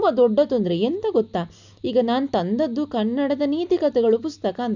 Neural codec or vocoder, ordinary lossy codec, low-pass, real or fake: none; none; 7.2 kHz; real